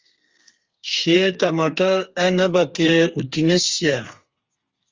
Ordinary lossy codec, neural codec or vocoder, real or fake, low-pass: Opus, 32 kbps; codec, 32 kHz, 1.9 kbps, SNAC; fake; 7.2 kHz